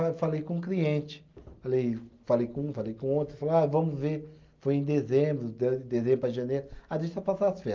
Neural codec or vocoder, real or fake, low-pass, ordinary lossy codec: none; real; 7.2 kHz; Opus, 32 kbps